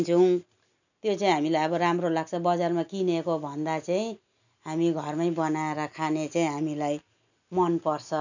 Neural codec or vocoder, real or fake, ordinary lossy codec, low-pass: none; real; none; 7.2 kHz